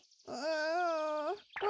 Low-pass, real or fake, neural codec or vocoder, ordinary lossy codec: none; real; none; none